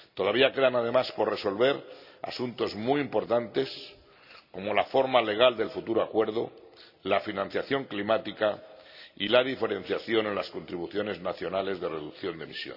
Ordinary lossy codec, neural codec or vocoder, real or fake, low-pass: none; none; real; 5.4 kHz